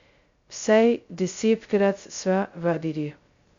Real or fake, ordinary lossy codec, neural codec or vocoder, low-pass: fake; Opus, 64 kbps; codec, 16 kHz, 0.2 kbps, FocalCodec; 7.2 kHz